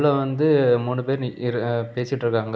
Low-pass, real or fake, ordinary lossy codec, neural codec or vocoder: none; real; none; none